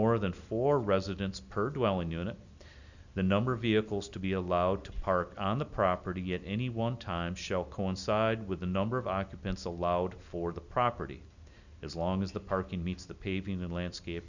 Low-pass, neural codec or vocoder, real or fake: 7.2 kHz; none; real